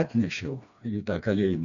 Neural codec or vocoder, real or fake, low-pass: codec, 16 kHz, 2 kbps, FreqCodec, smaller model; fake; 7.2 kHz